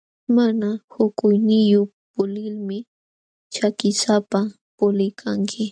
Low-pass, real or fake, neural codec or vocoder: 9.9 kHz; real; none